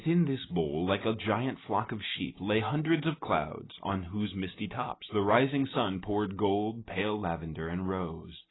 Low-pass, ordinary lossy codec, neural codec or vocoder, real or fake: 7.2 kHz; AAC, 16 kbps; none; real